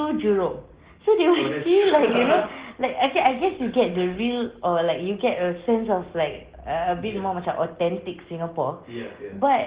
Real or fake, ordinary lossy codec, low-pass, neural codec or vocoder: real; Opus, 16 kbps; 3.6 kHz; none